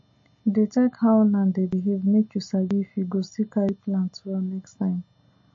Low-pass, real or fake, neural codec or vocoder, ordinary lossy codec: 7.2 kHz; real; none; MP3, 32 kbps